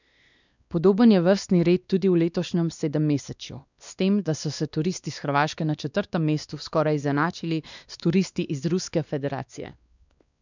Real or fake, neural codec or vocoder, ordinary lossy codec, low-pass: fake; codec, 16 kHz, 2 kbps, X-Codec, WavLM features, trained on Multilingual LibriSpeech; none; 7.2 kHz